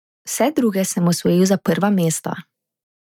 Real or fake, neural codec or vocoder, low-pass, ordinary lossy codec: real; none; 19.8 kHz; none